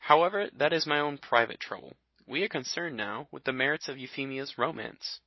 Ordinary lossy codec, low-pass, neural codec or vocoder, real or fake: MP3, 24 kbps; 7.2 kHz; none; real